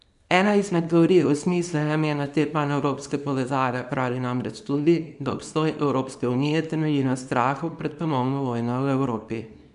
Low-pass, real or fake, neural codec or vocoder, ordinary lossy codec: 10.8 kHz; fake; codec, 24 kHz, 0.9 kbps, WavTokenizer, small release; none